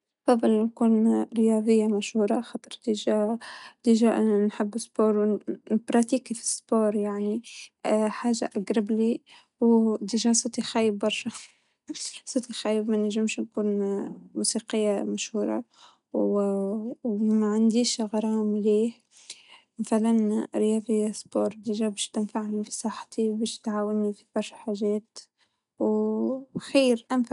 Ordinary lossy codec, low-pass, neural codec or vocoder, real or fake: none; 10.8 kHz; none; real